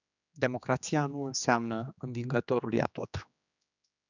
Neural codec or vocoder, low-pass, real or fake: codec, 16 kHz, 2 kbps, X-Codec, HuBERT features, trained on general audio; 7.2 kHz; fake